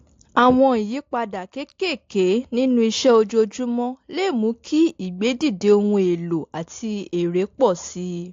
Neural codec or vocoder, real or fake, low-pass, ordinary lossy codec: none; real; 7.2 kHz; AAC, 48 kbps